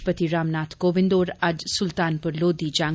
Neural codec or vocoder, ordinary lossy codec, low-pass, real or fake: none; none; none; real